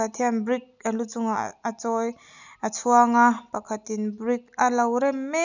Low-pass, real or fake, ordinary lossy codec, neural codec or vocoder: 7.2 kHz; real; none; none